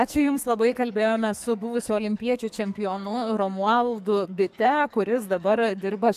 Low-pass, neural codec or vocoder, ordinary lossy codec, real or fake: 14.4 kHz; codec, 44.1 kHz, 2.6 kbps, SNAC; AAC, 96 kbps; fake